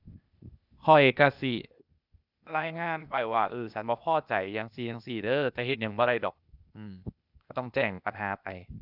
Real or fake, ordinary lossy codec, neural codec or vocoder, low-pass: fake; none; codec, 16 kHz, 0.8 kbps, ZipCodec; 5.4 kHz